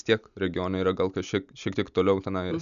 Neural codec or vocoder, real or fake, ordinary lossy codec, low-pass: none; real; MP3, 96 kbps; 7.2 kHz